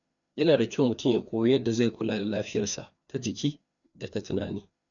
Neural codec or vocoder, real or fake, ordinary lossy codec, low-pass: codec, 16 kHz, 2 kbps, FreqCodec, larger model; fake; none; 7.2 kHz